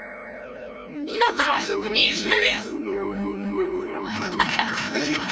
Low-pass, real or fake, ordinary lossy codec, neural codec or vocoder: none; fake; none; codec, 16 kHz, 0.5 kbps, FreqCodec, larger model